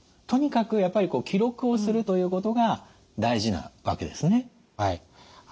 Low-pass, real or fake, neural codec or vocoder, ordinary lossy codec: none; real; none; none